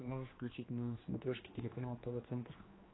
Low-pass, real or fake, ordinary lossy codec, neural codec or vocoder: 7.2 kHz; fake; AAC, 16 kbps; codec, 16 kHz, 2 kbps, X-Codec, HuBERT features, trained on general audio